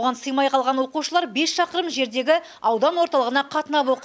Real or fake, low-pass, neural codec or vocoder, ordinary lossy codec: real; none; none; none